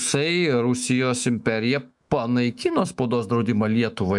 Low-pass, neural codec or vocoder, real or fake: 10.8 kHz; autoencoder, 48 kHz, 128 numbers a frame, DAC-VAE, trained on Japanese speech; fake